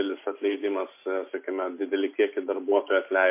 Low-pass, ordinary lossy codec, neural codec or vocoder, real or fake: 3.6 kHz; MP3, 24 kbps; none; real